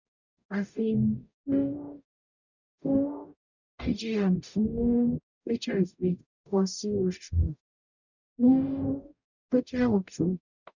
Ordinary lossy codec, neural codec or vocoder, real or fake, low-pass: none; codec, 44.1 kHz, 0.9 kbps, DAC; fake; 7.2 kHz